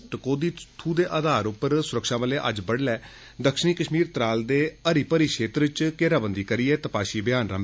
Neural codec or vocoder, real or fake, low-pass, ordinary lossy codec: none; real; none; none